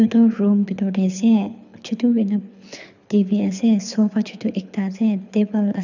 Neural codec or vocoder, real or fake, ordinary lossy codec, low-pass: codec, 24 kHz, 6 kbps, HILCodec; fake; none; 7.2 kHz